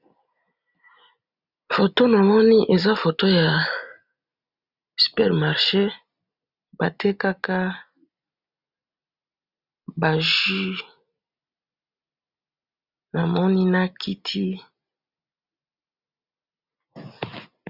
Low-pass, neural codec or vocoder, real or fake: 5.4 kHz; none; real